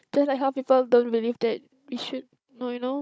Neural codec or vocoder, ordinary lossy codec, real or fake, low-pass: codec, 16 kHz, 16 kbps, FunCodec, trained on Chinese and English, 50 frames a second; none; fake; none